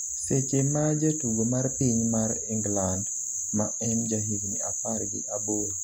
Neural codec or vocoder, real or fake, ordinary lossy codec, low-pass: none; real; none; 19.8 kHz